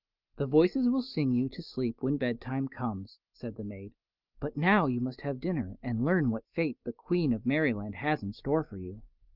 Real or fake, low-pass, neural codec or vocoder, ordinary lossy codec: real; 5.4 kHz; none; Opus, 32 kbps